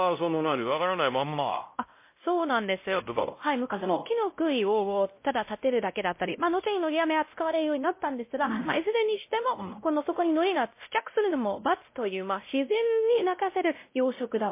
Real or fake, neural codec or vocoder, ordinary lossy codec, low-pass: fake; codec, 16 kHz, 0.5 kbps, X-Codec, WavLM features, trained on Multilingual LibriSpeech; MP3, 24 kbps; 3.6 kHz